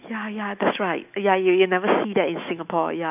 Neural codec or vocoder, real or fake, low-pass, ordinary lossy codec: autoencoder, 48 kHz, 128 numbers a frame, DAC-VAE, trained on Japanese speech; fake; 3.6 kHz; none